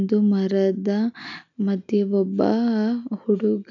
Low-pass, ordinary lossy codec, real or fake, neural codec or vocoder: 7.2 kHz; none; real; none